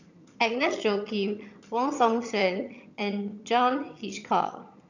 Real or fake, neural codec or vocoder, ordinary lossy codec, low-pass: fake; vocoder, 22.05 kHz, 80 mel bands, HiFi-GAN; none; 7.2 kHz